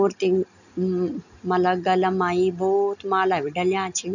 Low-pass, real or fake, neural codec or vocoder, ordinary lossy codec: 7.2 kHz; real; none; none